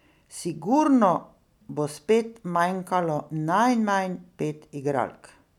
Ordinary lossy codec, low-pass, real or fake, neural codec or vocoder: none; 19.8 kHz; real; none